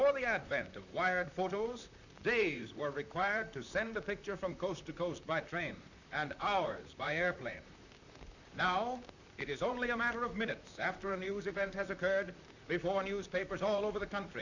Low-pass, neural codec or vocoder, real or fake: 7.2 kHz; vocoder, 44.1 kHz, 128 mel bands, Pupu-Vocoder; fake